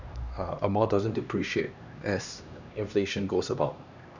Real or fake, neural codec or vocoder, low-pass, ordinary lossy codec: fake; codec, 16 kHz, 2 kbps, X-Codec, HuBERT features, trained on LibriSpeech; 7.2 kHz; none